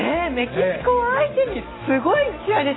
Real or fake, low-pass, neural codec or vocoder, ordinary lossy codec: real; 7.2 kHz; none; AAC, 16 kbps